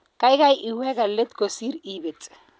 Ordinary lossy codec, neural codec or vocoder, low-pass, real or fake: none; none; none; real